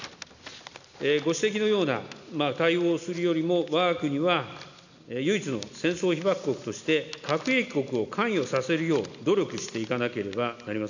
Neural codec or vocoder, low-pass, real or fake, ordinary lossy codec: none; 7.2 kHz; real; none